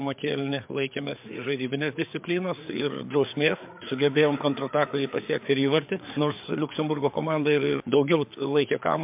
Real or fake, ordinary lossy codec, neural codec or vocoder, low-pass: fake; MP3, 32 kbps; codec, 16 kHz, 4 kbps, FreqCodec, larger model; 3.6 kHz